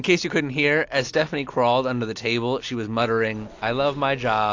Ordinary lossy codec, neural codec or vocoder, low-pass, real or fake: AAC, 48 kbps; none; 7.2 kHz; real